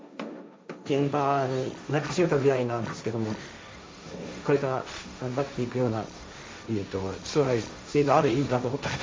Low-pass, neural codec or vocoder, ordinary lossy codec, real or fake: none; codec, 16 kHz, 1.1 kbps, Voila-Tokenizer; none; fake